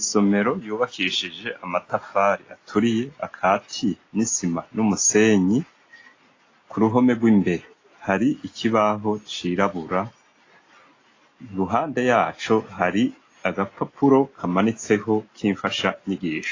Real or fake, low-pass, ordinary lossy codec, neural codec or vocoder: real; 7.2 kHz; AAC, 32 kbps; none